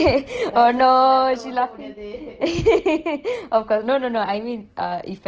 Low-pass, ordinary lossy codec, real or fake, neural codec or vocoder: 7.2 kHz; Opus, 16 kbps; real; none